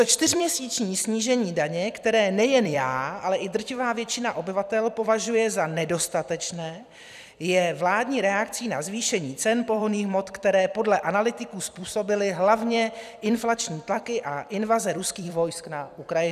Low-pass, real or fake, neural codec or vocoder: 14.4 kHz; fake; vocoder, 44.1 kHz, 128 mel bands every 256 samples, BigVGAN v2